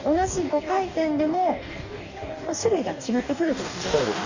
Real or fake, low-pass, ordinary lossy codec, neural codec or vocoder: fake; 7.2 kHz; none; codec, 44.1 kHz, 2.6 kbps, DAC